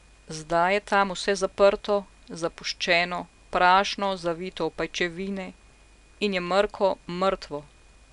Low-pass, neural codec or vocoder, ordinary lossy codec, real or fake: 10.8 kHz; none; none; real